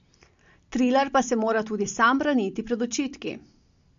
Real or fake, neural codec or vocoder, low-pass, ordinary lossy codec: real; none; 7.2 kHz; MP3, 48 kbps